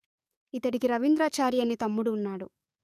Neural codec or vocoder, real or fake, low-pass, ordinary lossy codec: codec, 44.1 kHz, 7.8 kbps, DAC; fake; 14.4 kHz; none